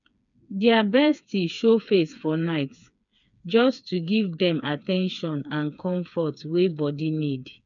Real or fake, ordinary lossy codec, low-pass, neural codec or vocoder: fake; none; 7.2 kHz; codec, 16 kHz, 4 kbps, FreqCodec, smaller model